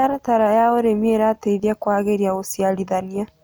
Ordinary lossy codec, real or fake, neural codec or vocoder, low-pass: none; real; none; none